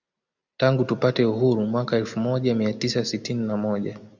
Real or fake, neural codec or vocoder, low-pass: real; none; 7.2 kHz